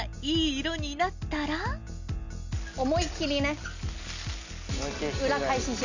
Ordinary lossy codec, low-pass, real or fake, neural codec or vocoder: none; 7.2 kHz; real; none